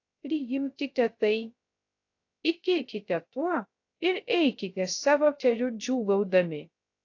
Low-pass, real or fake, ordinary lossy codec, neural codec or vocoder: 7.2 kHz; fake; AAC, 48 kbps; codec, 16 kHz, 0.3 kbps, FocalCodec